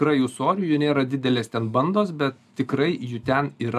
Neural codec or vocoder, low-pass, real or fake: none; 14.4 kHz; real